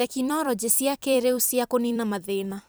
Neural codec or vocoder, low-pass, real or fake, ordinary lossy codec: vocoder, 44.1 kHz, 128 mel bands every 256 samples, BigVGAN v2; none; fake; none